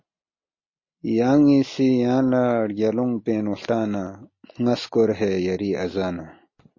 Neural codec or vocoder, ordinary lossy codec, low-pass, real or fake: none; MP3, 32 kbps; 7.2 kHz; real